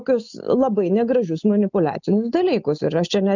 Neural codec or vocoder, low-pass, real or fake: none; 7.2 kHz; real